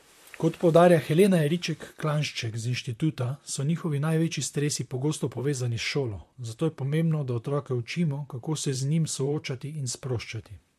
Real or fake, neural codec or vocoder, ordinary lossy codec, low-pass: fake; vocoder, 44.1 kHz, 128 mel bands, Pupu-Vocoder; MP3, 64 kbps; 14.4 kHz